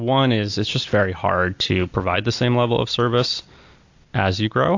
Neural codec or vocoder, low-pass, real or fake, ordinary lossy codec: none; 7.2 kHz; real; AAC, 48 kbps